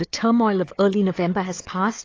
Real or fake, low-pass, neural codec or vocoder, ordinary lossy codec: fake; 7.2 kHz; codec, 16 kHz, 4 kbps, FunCodec, trained on Chinese and English, 50 frames a second; AAC, 32 kbps